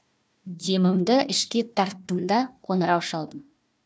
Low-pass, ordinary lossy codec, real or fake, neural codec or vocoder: none; none; fake; codec, 16 kHz, 1 kbps, FunCodec, trained on Chinese and English, 50 frames a second